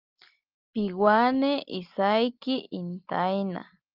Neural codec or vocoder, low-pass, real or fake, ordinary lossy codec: none; 5.4 kHz; real; Opus, 24 kbps